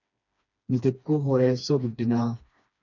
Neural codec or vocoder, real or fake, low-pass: codec, 16 kHz, 2 kbps, FreqCodec, smaller model; fake; 7.2 kHz